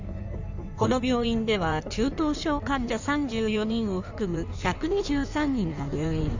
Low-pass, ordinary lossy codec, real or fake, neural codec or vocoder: 7.2 kHz; Opus, 64 kbps; fake; codec, 16 kHz in and 24 kHz out, 1.1 kbps, FireRedTTS-2 codec